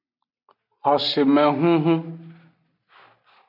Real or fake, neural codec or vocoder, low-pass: real; none; 5.4 kHz